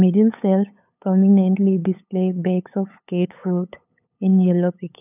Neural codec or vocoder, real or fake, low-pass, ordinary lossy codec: codec, 16 kHz, 8 kbps, FunCodec, trained on LibriTTS, 25 frames a second; fake; 3.6 kHz; none